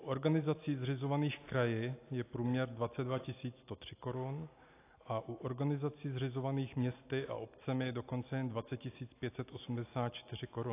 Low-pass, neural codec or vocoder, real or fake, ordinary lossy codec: 3.6 kHz; none; real; AAC, 24 kbps